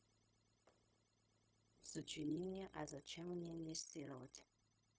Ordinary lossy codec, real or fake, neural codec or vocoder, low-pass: none; fake; codec, 16 kHz, 0.4 kbps, LongCat-Audio-Codec; none